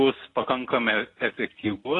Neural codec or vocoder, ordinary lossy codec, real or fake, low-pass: none; AAC, 32 kbps; real; 10.8 kHz